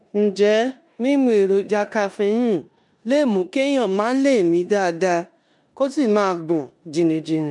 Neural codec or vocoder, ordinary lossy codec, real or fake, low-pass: codec, 16 kHz in and 24 kHz out, 0.9 kbps, LongCat-Audio-Codec, four codebook decoder; none; fake; 10.8 kHz